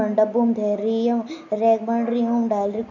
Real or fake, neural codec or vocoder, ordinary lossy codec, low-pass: real; none; none; 7.2 kHz